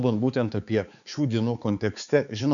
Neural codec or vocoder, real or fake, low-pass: codec, 16 kHz, 4 kbps, X-Codec, HuBERT features, trained on balanced general audio; fake; 7.2 kHz